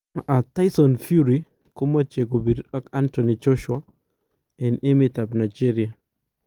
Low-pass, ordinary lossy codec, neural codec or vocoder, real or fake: 19.8 kHz; Opus, 32 kbps; none; real